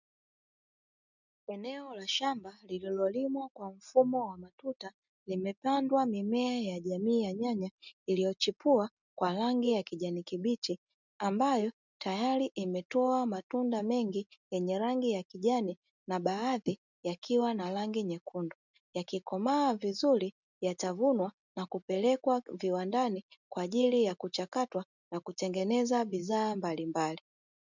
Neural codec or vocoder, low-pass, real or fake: none; 7.2 kHz; real